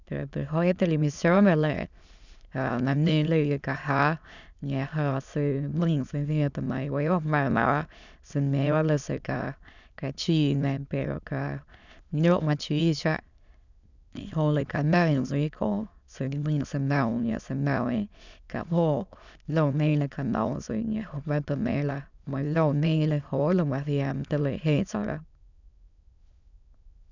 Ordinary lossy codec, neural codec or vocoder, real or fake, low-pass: none; autoencoder, 22.05 kHz, a latent of 192 numbers a frame, VITS, trained on many speakers; fake; 7.2 kHz